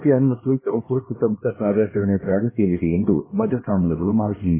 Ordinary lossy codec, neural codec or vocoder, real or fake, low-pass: MP3, 16 kbps; codec, 16 kHz, 1 kbps, X-Codec, HuBERT features, trained on LibriSpeech; fake; 3.6 kHz